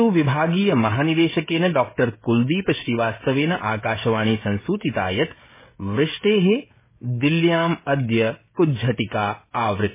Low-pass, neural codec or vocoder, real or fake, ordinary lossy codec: 3.6 kHz; codec, 16 kHz, 16 kbps, FreqCodec, smaller model; fake; MP3, 16 kbps